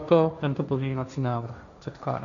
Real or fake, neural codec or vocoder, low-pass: fake; codec, 16 kHz, 1.1 kbps, Voila-Tokenizer; 7.2 kHz